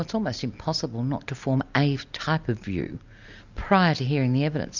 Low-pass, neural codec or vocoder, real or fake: 7.2 kHz; none; real